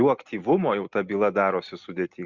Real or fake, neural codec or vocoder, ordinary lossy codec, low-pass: real; none; Opus, 64 kbps; 7.2 kHz